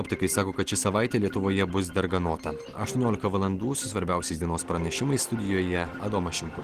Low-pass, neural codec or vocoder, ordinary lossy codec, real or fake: 14.4 kHz; none; Opus, 16 kbps; real